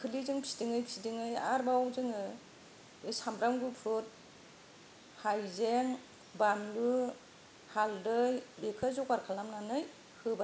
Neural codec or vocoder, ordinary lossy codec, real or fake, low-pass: none; none; real; none